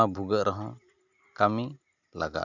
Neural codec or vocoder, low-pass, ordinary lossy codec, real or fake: none; 7.2 kHz; none; real